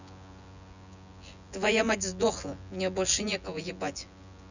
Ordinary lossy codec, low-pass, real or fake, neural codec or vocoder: none; 7.2 kHz; fake; vocoder, 24 kHz, 100 mel bands, Vocos